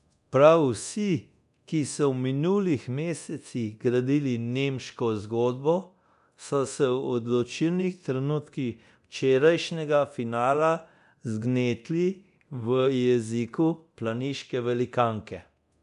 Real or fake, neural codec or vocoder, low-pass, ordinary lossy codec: fake; codec, 24 kHz, 0.9 kbps, DualCodec; 10.8 kHz; none